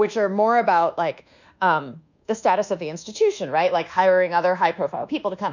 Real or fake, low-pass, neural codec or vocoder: fake; 7.2 kHz; codec, 24 kHz, 1.2 kbps, DualCodec